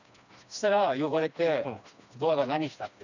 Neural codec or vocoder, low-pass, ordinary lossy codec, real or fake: codec, 16 kHz, 2 kbps, FreqCodec, smaller model; 7.2 kHz; none; fake